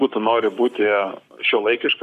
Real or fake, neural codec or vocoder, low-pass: fake; codec, 44.1 kHz, 7.8 kbps, Pupu-Codec; 14.4 kHz